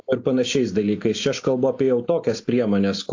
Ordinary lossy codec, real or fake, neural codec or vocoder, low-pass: AAC, 48 kbps; real; none; 7.2 kHz